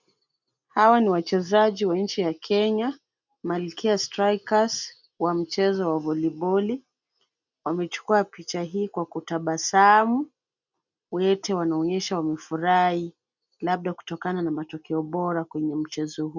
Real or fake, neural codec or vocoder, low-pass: real; none; 7.2 kHz